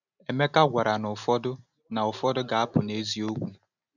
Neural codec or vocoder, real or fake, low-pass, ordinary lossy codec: none; real; 7.2 kHz; none